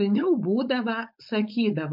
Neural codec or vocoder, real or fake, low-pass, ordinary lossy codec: codec, 16 kHz, 4.8 kbps, FACodec; fake; 5.4 kHz; MP3, 48 kbps